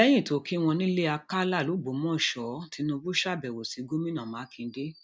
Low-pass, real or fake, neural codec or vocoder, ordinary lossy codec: none; real; none; none